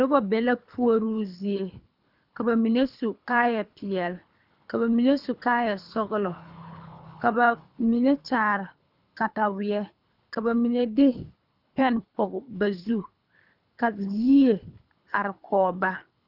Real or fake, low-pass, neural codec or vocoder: fake; 5.4 kHz; codec, 24 kHz, 3 kbps, HILCodec